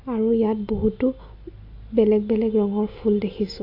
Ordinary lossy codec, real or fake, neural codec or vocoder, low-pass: AAC, 32 kbps; real; none; 5.4 kHz